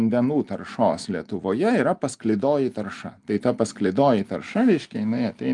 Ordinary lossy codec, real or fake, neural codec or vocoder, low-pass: Opus, 32 kbps; real; none; 10.8 kHz